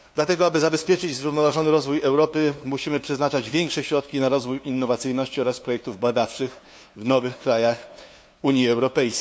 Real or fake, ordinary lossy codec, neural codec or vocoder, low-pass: fake; none; codec, 16 kHz, 2 kbps, FunCodec, trained on LibriTTS, 25 frames a second; none